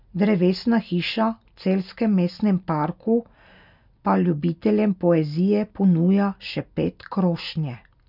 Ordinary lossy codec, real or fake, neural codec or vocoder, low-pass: none; real; none; 5.4 kHz